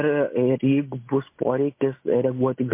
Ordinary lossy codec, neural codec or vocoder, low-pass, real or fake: MP3, 24 kbps; none; 3.6 kHz; real